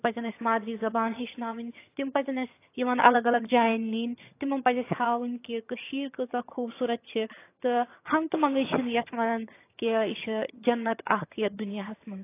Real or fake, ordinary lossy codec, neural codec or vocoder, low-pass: fake; AAC, 24 kbps; vocoder, 22.05 kHz, 80 mel bands, HiFi-GAN; 3.6 kHz